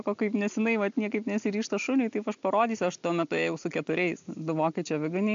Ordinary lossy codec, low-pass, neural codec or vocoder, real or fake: AAC, 64 kbps; 7.2 kHz; none; real